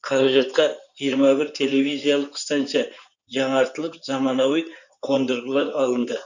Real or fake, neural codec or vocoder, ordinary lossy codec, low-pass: fake; codec, 16 kHz in and 24 kHz out, 2.2 kbps, FireRedTTS-2 codec; none; 7.2 kHz